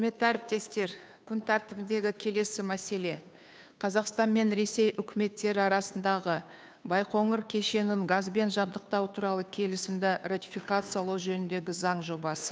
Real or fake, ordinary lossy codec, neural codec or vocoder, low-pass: fake; none; codec, 16 kHz, 2 kbps, FunCodec, trained on Chinese and English, 25 frames a second; none